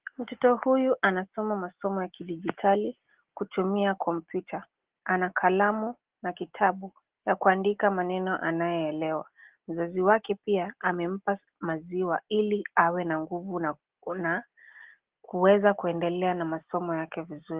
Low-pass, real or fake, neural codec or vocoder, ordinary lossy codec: 3.6 kHz; real; none; Opus, 16 kbps